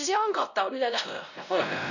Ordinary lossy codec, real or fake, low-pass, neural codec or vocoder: none; fake; 7.2 kHz; codec, 16 kHz, 0.5 kbps, X-Codec, WavLM features, trained on Multilingual LibriSpeech